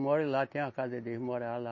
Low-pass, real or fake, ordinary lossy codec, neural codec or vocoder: 7.2 kHz; real; MP3, 32 kbps; none